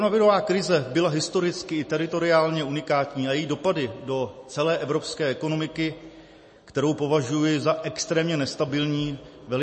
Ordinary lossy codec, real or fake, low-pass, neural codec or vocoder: MP3, 32 kbps; real; 10.8 kHz; none